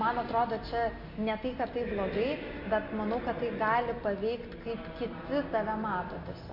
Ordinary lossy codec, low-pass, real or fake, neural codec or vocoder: MP3, 32 kbps; 5.4 kHz; real; none